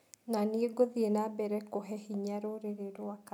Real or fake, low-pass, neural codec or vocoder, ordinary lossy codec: real; 19.8 kHz; none; none